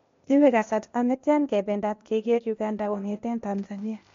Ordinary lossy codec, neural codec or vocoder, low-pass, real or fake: MP3, 48 kbps; codec, 16 kHz, 0.8 kbps, ZipCodec; 7.2 kHz; fake